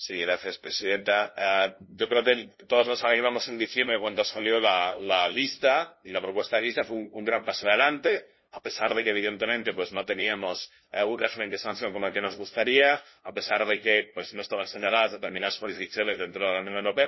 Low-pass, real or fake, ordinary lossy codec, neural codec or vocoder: 7.2 kHz; fake; MP3, 24 kbps; codec, 16 kHz, 0.5 kbps, FunCodec, trained on LibriTTS, 25 frames a second